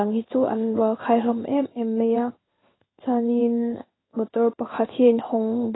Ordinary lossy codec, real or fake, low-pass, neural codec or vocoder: AAC, 16 kbps; fake; 7.2 kHz; codec, 16 kHz in and 24 kHz out, 1 kbps, XY-Tokenizer